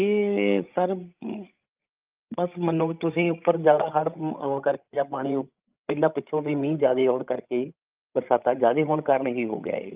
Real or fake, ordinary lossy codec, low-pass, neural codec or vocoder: fake; Opus, 24 kbps; 3.6 kHz; codec, 16 kHz, 16 kbps, FreqCodec, larger model